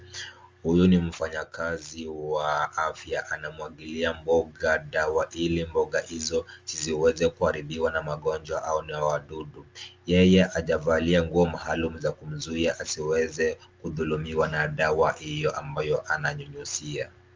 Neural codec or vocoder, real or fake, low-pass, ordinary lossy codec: none; real; 7.2 kHz; Opus, 32 kbps